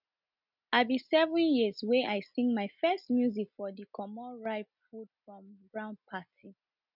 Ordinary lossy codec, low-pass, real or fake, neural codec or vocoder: none; 5.4 kHz; real; none